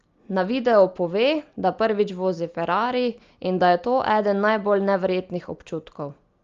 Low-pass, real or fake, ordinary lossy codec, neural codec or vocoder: 7.2 kHz; real; Opus, 32 kbps; none